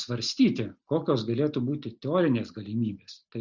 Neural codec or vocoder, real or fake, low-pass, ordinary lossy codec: none; real; 7.2 kHz; Opus, 64 kbps